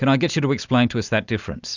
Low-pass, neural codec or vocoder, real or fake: 7.2 kHz; none; real